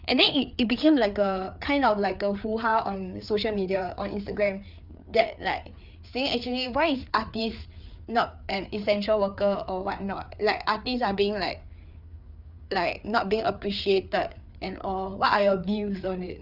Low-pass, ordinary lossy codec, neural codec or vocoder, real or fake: 5.4 kHz; Opus, 64 kbps; codec, 16 kHz, 4 kbps, FreqCodec, larger model; fake